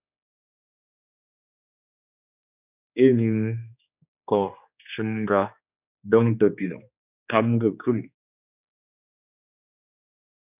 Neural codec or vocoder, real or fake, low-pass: codec, 16 kHz, 2 kbps, X-Codec, HuBERT features, trained on general audio; fake; 3.6 kHz